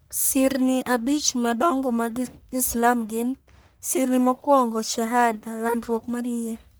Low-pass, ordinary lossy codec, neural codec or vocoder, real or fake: none; none; codec, 44.1 kHz, 1.7 kbps, Pupu-Codec; fake